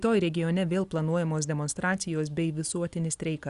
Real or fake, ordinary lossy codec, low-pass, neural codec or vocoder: real; Opus, 64 kbps; 10.8 kHz; none